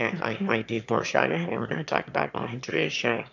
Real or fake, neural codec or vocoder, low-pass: fake; autoencoder, 22.05 kHz, a latent of 192 numbers a frame, VITS, trained on one speaker; 7.2 kHz